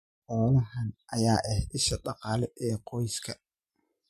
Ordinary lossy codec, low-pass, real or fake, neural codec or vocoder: MP3, 64 kbps; 14.4 kHz; real; none